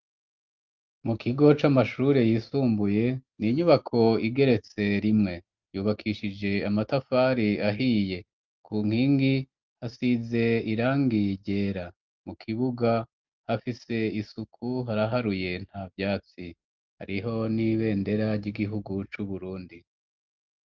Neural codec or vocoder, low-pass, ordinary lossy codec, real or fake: none; 7.2 kHz; Opus, 24 kbps; real